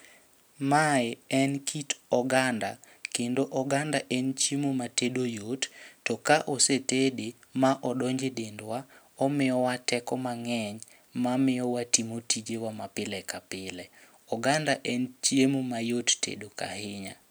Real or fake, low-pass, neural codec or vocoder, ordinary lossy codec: real; none; none; none